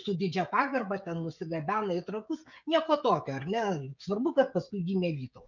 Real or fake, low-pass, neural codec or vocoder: fake; 7.2 kHz; codec, 16 kHz, 16 kbps, FreqCodec, smaller model